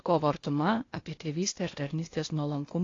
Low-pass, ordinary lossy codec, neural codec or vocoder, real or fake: 7.2 kHz; AAC, 32 kbps; codec, 16 kHz, 0.8 kbps, ZipCodec; fake